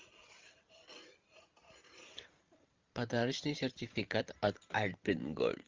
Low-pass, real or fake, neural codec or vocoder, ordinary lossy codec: 7.2 kHz; fake; vocoder, 44.1 kHz, 128 mel bands every 512 samples, BigVGAN v2; Opus, 24 kbps